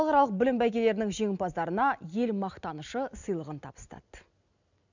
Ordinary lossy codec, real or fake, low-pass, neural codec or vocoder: none; real; 7.2 kHz; none